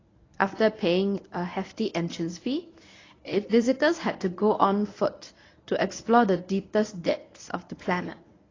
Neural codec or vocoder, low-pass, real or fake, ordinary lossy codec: codec, 24 kHz, 0.9 kbps, WavTokenizer, medium speech release version 1; 7.2 kHz; fake; AAC, 32 kbps